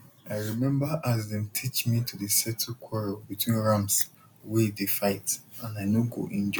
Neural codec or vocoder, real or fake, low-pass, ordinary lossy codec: none; real; none; none